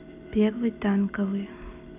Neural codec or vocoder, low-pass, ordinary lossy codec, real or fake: none; 3.6 kHz; none; real